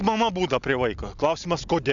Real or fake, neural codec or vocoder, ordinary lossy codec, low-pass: real; none; MP3, 96 kbps; 7.2 kHz